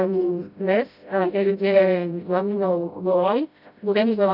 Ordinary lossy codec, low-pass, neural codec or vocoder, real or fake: none; 5.4 kHz; codec, 16 kHz, 0.5 kbps, FreqCodec, smaller model; fake